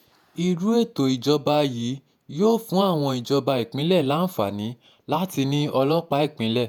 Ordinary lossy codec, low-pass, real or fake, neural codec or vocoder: none; 19.8 kHz; fake; vocoder, 48 kHz, 128 mel bands, Vocos